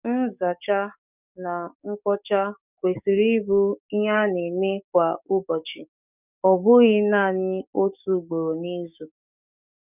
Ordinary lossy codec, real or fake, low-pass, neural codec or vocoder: none; fake; 3.6 kHz; codec, 44.1 kHz, 7.8 kbps, DAC